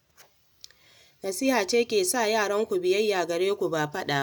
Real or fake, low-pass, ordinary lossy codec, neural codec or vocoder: real; none; none; none